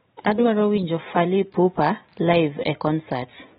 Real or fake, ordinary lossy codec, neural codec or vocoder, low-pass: real; AAC, 16 kbps; none; 7.2 kHz